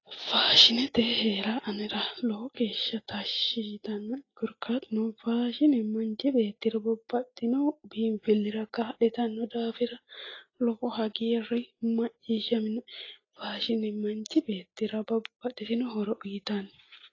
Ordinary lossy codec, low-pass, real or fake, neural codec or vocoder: AAC, 32 kbps; 7.2 kHz; real; none